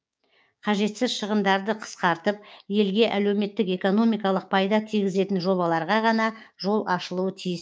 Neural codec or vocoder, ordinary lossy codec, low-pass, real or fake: codec, 16 kHz, 6 kbps, DAC; none; none; fake